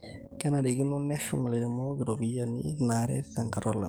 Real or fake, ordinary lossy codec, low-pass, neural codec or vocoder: fake; none; none; codec, 44.1 kHz, 7.8 kbps, DAC